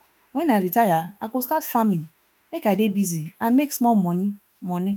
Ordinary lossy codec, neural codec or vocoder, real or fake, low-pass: none; autoencoder, 48 kHz, 32 numbers a frame, DAC-VAE, trained on Japanese speech; fake; none